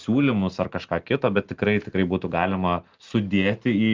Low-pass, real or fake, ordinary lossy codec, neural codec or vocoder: 7.2 kHz; real; Opus, 32 kbps; none